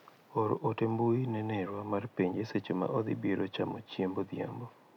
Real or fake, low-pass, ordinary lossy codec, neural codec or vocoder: real; 19.8 kHz; none; none